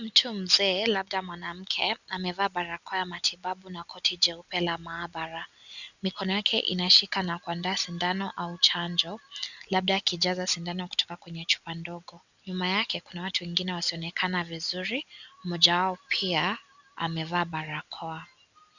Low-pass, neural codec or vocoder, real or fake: 7.2 kHz; none; real